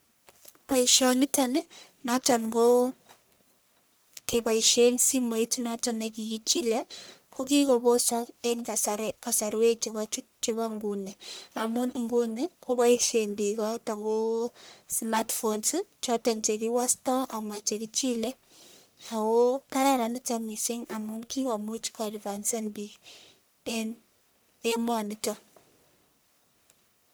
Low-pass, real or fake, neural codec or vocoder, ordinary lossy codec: none; fake; codec, 44.1 kHz, 1.7 kbps, Pupu-Codec; none